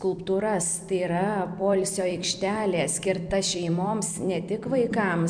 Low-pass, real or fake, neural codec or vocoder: 9.9 kHz; fake; vocoder, 44.1 kHz, 128 mel bands every 256 samples, BigVGAN v2